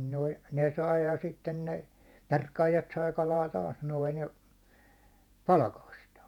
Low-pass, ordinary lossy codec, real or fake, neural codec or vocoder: 19.8 kHz; none; fake; vocoder, 48 kHz, 128 mel bands, Vocos